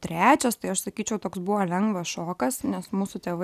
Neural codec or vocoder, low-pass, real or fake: none; 14.4 kHz; real